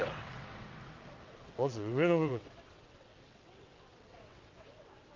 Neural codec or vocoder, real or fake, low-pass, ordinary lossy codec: vocoder, 22.05 kHz, 80 mel bands, WaveNeXt; fake; 7.2 kHz; Opus, 32 kbps